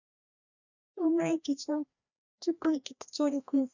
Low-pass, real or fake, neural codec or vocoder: 7.2 kHz; fake; codec, 16 kHz, 1 kbps, FreqCodec, larger model